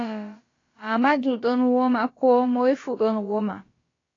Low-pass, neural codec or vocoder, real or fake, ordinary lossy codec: 7.2 kHz; codec, 16 kHz, about 1 kbps, DyCAST, with the encoder's durations; fake; AAC, 32 kbps